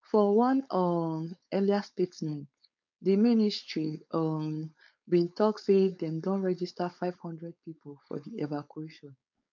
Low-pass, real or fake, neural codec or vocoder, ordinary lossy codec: 7.2 kHz; fake; codec, 16 kHz, 4.8 kbps, FACodec; MP3, 64 kbps